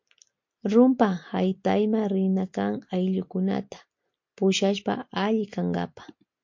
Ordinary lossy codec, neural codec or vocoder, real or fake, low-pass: MP3, 48 kbps; none; real; 7.2 kHz